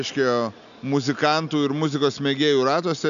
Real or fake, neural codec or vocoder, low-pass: real; none; 7.2 kHz